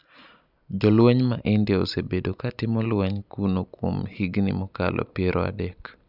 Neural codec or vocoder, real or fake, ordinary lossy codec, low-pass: none; real; none; 5.4 kHz